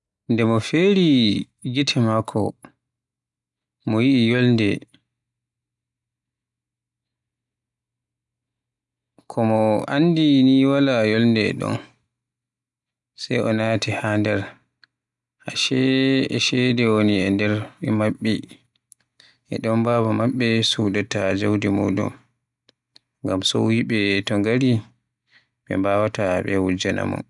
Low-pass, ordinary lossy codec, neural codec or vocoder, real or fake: 10.8 kHz; none; none; real